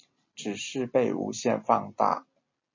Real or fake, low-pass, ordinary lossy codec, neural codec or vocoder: real; 7.2 kHz; MP3, 32 kbps; none